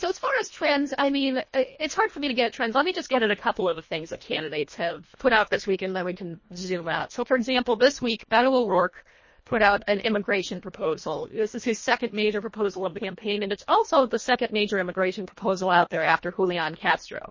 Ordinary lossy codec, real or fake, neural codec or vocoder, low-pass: MP3, 32 kbps; fake; codec, 24 kHz, 1.5 kbps, HILCodec; 7.2 kHz